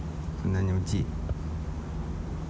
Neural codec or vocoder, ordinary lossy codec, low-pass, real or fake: none; none; none; real